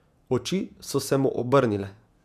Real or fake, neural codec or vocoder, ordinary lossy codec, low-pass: fake; vocoder, 48 kHz, 128 mel bands, Vocos; none; 14.4 kHz